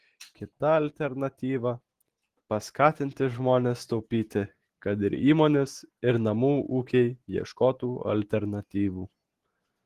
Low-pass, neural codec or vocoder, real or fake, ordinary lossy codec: 14.4 kHz; none; real; Opus, 16 kbps